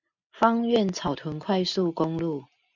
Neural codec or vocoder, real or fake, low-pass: none; real; 7.2 kHz